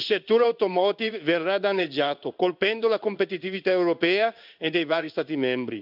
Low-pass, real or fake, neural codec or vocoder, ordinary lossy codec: 5.4 kHz; fake; codec, 16 kHz in and 24 kHz out, 1 kbps, XY-Tokenizer; none